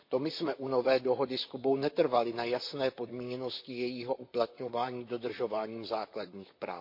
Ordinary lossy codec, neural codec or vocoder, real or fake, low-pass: MP3, 32 kbps; vocoder, 44.1 kHz, 128 mel bands, Pupu-Vocoder; fake; 5.4 kHz